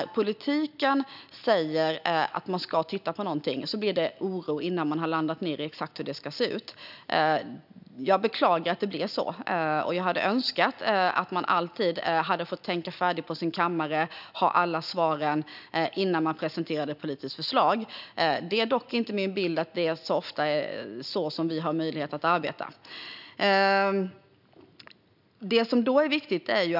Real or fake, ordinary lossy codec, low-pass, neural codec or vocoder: real; none; 5.4 kHz; none